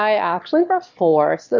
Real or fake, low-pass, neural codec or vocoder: fake; 7.2 kHz; autoencoder, 22.05 kHz, a latent of 192 numbers a frame, VITS, trained on one speaker